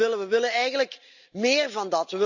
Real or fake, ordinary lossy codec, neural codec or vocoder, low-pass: real; none; none; 7.2 kHz